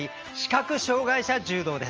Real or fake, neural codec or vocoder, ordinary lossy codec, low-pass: real; none; Opus, 24 kbps; 7.2 kHz